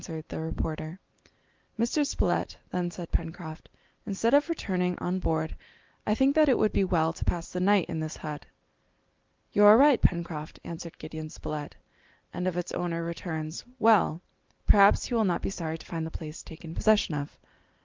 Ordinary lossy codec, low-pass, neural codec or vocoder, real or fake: Opus, 32 kbps; 7.2 kHz; none; real